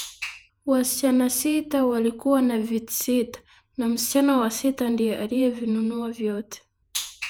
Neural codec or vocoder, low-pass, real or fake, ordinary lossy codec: vocoder, 48 kHz, 128 mel bands, Vocos; none; fake; none